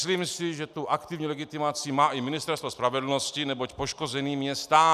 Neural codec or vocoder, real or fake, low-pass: none; real; 14.4 kHz